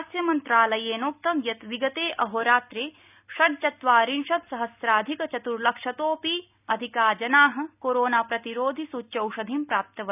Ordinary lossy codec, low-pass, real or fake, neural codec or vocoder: none; 3.6 kHz; real; none